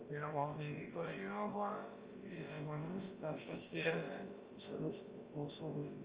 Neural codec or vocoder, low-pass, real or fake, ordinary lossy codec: codec, 16 kHz, about 1 kbps, DyCAST, with the encoder's durations; 3.6 kHz; fake; Opus, 32 kbps